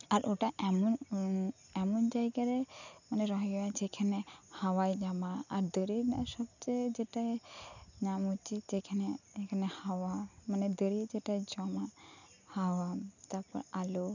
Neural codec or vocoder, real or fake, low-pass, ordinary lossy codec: none; real; 7.2 kHz; none